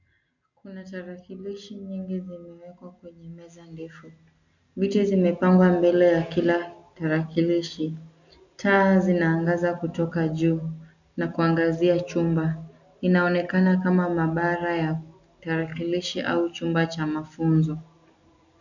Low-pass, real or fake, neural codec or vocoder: 7.2 kHz; real; none